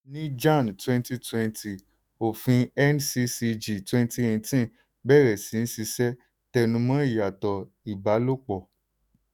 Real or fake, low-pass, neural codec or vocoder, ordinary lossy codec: fake; none; autoencoder, 48 kHz, 128 numbers a frame, DAC-VAE, trained on Japanese speech; none